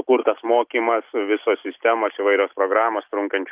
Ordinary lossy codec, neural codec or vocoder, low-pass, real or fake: Opus, 32 kbps; none; 3.6 kHz; real